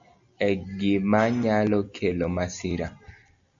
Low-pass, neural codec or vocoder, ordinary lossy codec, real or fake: 7.2 kHz; none; MP3, 96 kbps; real